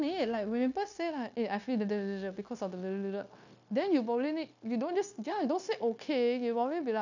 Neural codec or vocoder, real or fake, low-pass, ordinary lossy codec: codec, 16 kHz, 0.9 kbps, LongCat-Audio-Codec; fake; 7.2 kHz; none